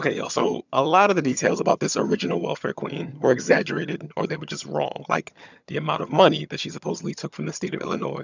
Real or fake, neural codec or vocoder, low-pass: fake; vocoder, 22.05 kHz, 80 mel bands, HiFi-GAN; 7.2 kHz